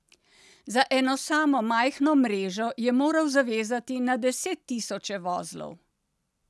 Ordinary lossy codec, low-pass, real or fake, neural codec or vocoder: none; none; real; none